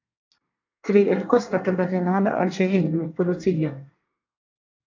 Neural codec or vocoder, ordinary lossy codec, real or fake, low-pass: codec, 24 kHz, 1 kbps, SNAC; AAC, 48 kbps; fake; 7.2 kHz